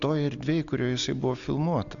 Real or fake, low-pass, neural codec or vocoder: real; 7.2 kHz; none